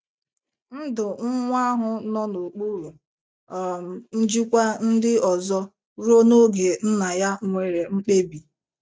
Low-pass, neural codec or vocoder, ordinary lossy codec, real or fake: none; none; none; real